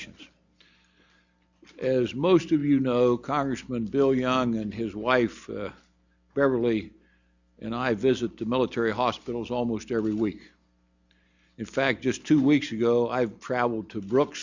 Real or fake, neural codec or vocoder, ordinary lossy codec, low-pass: real; none; Opus, 64 kbps; 7.2 kHz